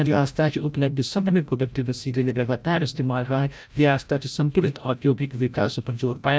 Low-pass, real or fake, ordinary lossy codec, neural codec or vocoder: none; fake; none; codec, 16 kHz, 0.5 kbps, FreqCodec, larger model